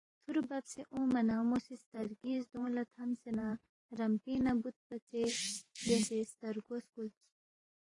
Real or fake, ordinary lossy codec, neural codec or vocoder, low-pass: fake; AAC, 32 kbps; vocoder, 44.1 kHz, 128 mel bands every 512 samples, BigVGAN v2; 10.8 kHz